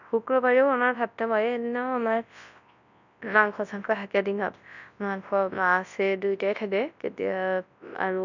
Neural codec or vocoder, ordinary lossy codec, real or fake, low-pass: codec, 24 kHz, 0.9 kbps, WavTokenizer, large speech release; none; fake; 7.2 kHz